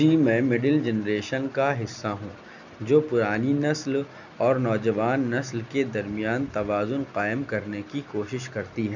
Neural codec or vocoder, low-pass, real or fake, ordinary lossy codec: none; 7.2 kHz; real; none